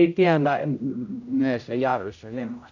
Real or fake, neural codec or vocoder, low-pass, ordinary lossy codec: fake; codec, 16 kHz, 0.5 kbps, X-Codec, HuBERT features, trained on general audio; 7.2 kHz; none